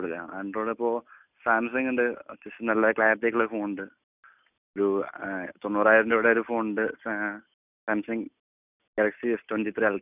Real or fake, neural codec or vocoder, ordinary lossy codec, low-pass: real; none; none; 3.6 kHz